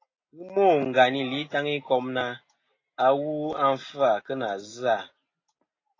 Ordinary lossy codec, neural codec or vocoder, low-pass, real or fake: AAC, 32 kbps; none; 7.2 kHz; real